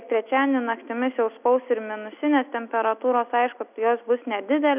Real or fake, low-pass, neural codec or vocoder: real; 3.6 kHz; none